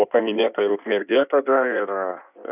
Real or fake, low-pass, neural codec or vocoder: fake; 3.6 kHz; codec, 16 kHz in and 24 kHz out, 1.1 kbps, FireRedTTS-2 codec